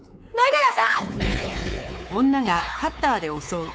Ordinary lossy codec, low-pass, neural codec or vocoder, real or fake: none; none; codec, 16 kHz, 4 kbps, X-Codec, WavLM features, trained on Multilingual LibriSpeech; fake